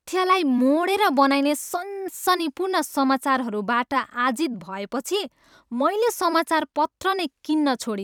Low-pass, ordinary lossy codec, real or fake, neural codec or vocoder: 19.8 kHz; none; fake; vocoder, 44.1 kHz, 128 mel bands every 256 samples, BigVGAN v2